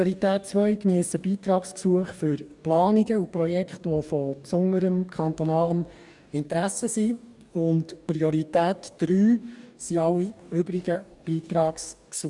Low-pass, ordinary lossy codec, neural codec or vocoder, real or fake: 10.8 kHz; none; codec, 44.1 kHz, 2.6 kbps, DAC; fake